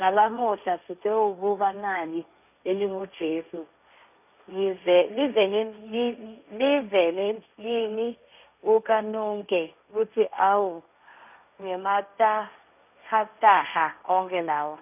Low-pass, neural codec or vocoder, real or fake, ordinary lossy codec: 3.6 kHz; codec, 16 kHz, 1.1 kbps, Voila-Tokenizer; fake; none